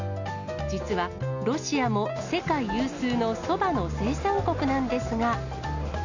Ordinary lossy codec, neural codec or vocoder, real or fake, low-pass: none; none; real; 7.2 kHz